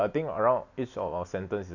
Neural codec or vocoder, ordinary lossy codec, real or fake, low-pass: none; none; real; 7.2 kHz